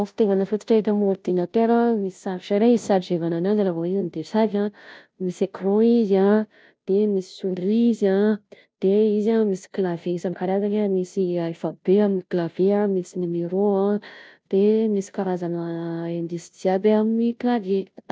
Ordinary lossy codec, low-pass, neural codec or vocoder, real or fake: none; none; codec, 16 kHz, 0.5 kbps, FunCodec, trained on Chinese and English, 25 frames a second; fake